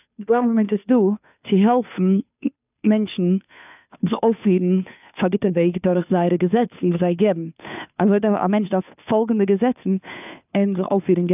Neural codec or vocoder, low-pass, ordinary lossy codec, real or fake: codec, 16 kHz, 2 kbps, FunCodec, trained on Chinese and English, 25 frames a second; 3.6 kHz; none; fake